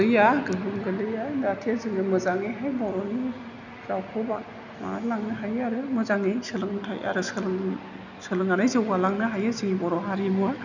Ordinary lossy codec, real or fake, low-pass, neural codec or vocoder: none; real; 7.2 kHz; none